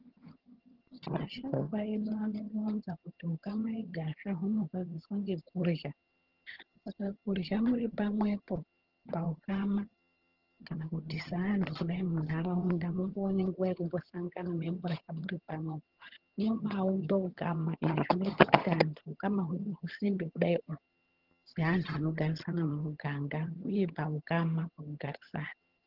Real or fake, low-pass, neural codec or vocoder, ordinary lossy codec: fake; 5.4 kHz; vocoder, 22.05 kHz, 80 mel bands, HiFi-GAN; Opus, 16 kbps